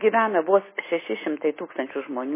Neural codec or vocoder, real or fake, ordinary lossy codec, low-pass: none; real; MP3, 16 kbps; 3.6 kHz